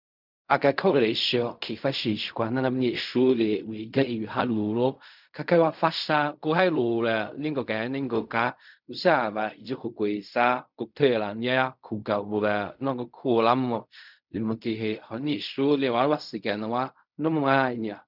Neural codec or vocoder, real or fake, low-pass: codec, 16 kHz in and 24 kHz out, 0.4 kbps, LongCat-Audio-Codec, fine tuned four codebook decoder; fake; 5.4 kHz